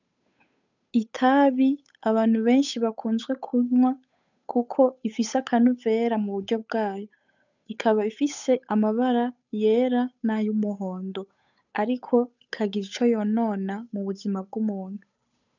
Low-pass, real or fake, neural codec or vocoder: 7.2 kHz; fake; codec, 16 kHz, 8 kbps, FunCodec, trained on Chinese and English, 25 frames a second